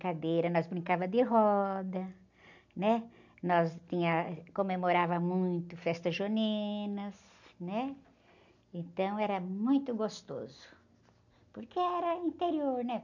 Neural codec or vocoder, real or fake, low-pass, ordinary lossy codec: none; real; 7.2 kHz; none